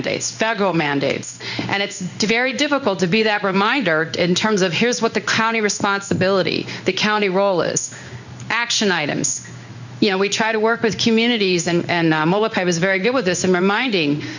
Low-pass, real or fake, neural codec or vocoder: 7.2 kHz; fake; codec, 16 kHz in and 24 kHz out, 1 kbps, XY-Tokenizer